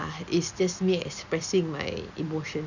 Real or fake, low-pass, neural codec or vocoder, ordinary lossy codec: real; 7.2 kHz; none; none